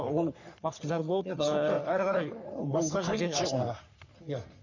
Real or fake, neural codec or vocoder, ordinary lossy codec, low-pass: fake; codec, 44.1 kHz, 3.4 kbps, Pupu-Codec; none; 7.2 kHz